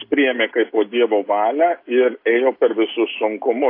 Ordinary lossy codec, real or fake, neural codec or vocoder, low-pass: AAC, 32 kbps; real; none; 5.4 kHz